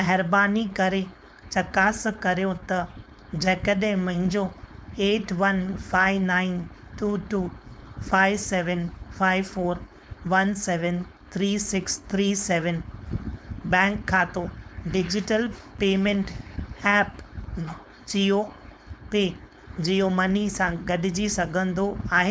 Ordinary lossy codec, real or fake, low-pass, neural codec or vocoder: none; fake; none; codec, 16 kHz, 4.8 kbps, FACodec